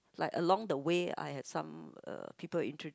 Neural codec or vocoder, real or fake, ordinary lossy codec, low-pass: none; real; none; none